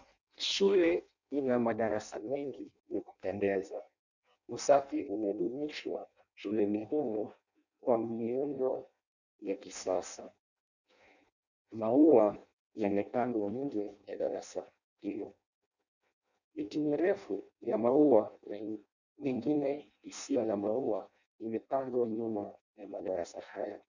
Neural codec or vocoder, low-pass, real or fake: codec, 16 kHz in and 24 kHz out, 0.6 kbps, FireRedTTS-2 codec; 7.2 kHz; fake